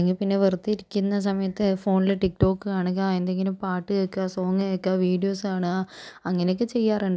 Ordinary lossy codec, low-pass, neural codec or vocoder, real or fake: none; none; none; real